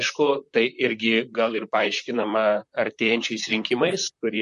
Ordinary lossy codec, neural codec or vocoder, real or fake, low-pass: MP3, 48 kbps; vocoder, 44.1 kHz, 128 mel bands, Pupu-Vocoder; fake; 14.4 kHz